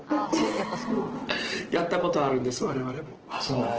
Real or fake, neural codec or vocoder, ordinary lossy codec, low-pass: real; none; Opus, 16 kbps; 7.2 kHz